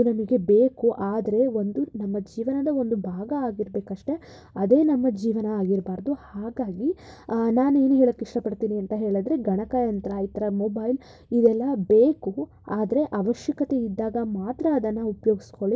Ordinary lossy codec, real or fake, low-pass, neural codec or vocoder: none; real; none; none